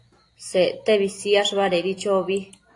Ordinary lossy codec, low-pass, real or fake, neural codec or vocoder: AAC, 48 kbps; 10.8 kHz; real; none